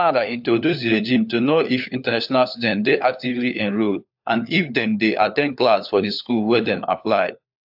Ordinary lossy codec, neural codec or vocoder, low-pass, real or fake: AAC, 48 kbps; codec, 16 kHz, 4 kbps, FunCodec, trained on LibriTTS, 50 frames a second; 5.4 kHz; fake